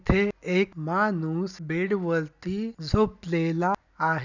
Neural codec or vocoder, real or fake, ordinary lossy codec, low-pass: none; real; none; 7.2 kHz